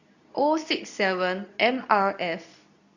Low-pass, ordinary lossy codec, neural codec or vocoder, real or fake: 7.2 kHz; none; codec, 24 kHz, 0.9 kbps, WavTokenizer, medium speech release version 2; fake